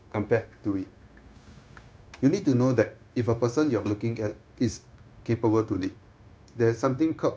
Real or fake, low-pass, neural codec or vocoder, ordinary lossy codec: fake; none; codec, 16 kHz, 0.9 kbps, LongCat-Audio-Codec; none